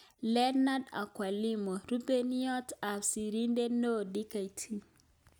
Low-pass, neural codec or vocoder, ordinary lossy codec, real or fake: none; none; none; real